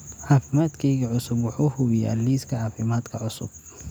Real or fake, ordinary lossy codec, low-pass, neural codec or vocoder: real; none; none; none